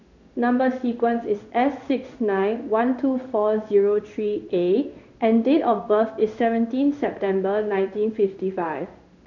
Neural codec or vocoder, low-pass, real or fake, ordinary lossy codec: codec, 16 kHz in and 24 kHz out, 1 kbps, XY-Tokenizer; 7.2 kHz; fake; none